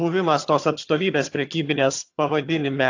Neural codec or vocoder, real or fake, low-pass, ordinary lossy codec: vocoder, 22.05 kHz, 80 mel bands, HiFi-GAN; fake; 7.2 kHz; AAC, 48 kbps